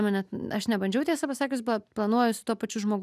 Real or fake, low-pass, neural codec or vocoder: real; 14.4 kHz; none